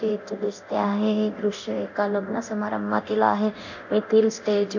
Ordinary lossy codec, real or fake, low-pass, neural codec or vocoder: none; fake; 7.2 kHz; codec, 24 kHz, 0.9 kbps, DualCodec